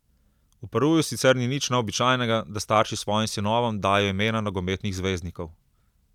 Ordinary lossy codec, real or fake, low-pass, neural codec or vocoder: none; real; 19.8 kHz; none